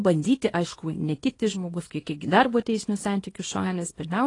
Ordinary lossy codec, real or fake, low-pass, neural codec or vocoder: AAC, 32 kbps; fake; 10.8 kHz; codec, 24 kHz, 0.9 kbps, WavTokenizer, small release